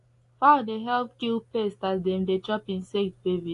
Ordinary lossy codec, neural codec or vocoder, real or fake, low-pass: AAC, 48 kbps; none; real; 10.8 kHz